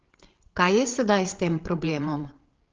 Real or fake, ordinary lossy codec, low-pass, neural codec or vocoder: fake; Opus, 16 kbps; 7.2 kHz; codec, 16 kHz, 8 kbps, FreqCodec, larger model